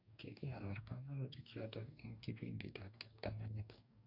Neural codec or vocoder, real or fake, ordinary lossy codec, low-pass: codec, 44.1 kHz, 2.6 kbps, DAC; fake; none; 5.4 kHz